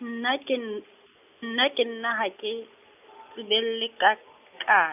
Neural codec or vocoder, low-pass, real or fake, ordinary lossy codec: none; 3.6 kHz; real; none